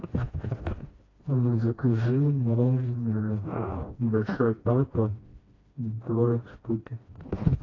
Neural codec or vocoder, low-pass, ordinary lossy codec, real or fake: codec, 16 kHz, 1 kbps, FreqCodec, smaller model; 7.2 kHz; AAC, 32 kbps; fake